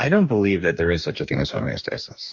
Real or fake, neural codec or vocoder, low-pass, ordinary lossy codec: fake; codec, 44.1 kHz, 2.6 kbps, DAC; 7.2 kHz; MP3, 48 kbps